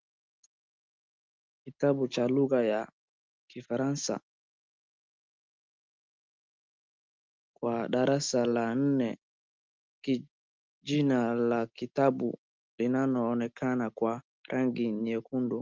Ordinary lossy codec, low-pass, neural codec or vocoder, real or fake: Opus, 32 kbps; 7.2 kHz; none; real